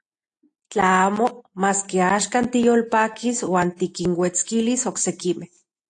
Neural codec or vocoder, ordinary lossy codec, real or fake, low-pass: none; AAC, 48 kbps; real; 9.9 kHz